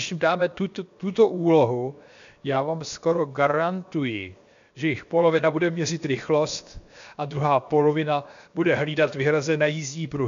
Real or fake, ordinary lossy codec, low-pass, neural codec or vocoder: fake; MP3, 64 kbps; 7.2 kHz; codec, 16 kHz, 0.7 kbps, FocalCodec